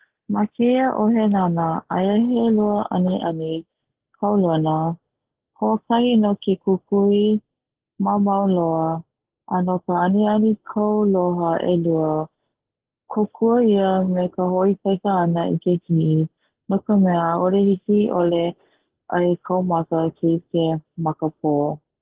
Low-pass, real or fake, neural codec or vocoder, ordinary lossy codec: 3.6 kHz; real; none; Opus, 16 kbps